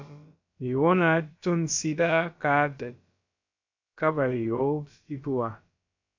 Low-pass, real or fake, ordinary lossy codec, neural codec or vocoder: 7.2 kHz; fake; MP3, 64 kbps; codec, 16 kHz, about 1 kbps, DyCAST, with the encoder's durations